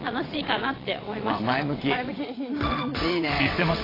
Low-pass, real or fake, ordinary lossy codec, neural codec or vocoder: 5.4 kHz; fake; AAC, 24 kbps; vocoder, 22.05 kHz, 80 mel bands, WaveNeXt